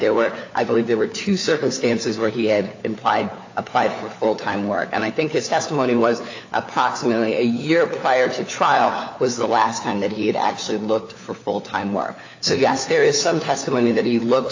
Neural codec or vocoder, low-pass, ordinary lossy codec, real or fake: codec, 16 kHz, 4 kbps, FunCodec, trained on LibriTTS, 50 frames a second; 7.2 kHz; AAC, 48 kbps; fake